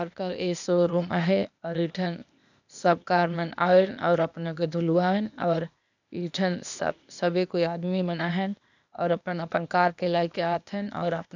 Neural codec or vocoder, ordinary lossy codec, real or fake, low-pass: codec, 16 kHz, 0.8 kbps, ZipCodec; none; fake; 7.2 kHz